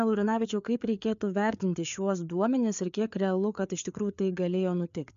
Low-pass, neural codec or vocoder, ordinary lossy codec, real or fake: 7.2 kHz; codec, 16 kHz, 4 kbps, FreqCodec, larger model; MP3, 64 kbps; fake